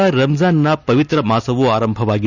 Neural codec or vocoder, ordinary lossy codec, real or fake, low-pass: none; none; real; 7.2 kHz